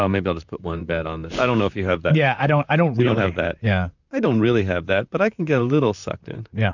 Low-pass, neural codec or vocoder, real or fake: 7.2 kHz; vocoder, 44.1 kHz, 128 mel bands, Pupu-Vocoder; fake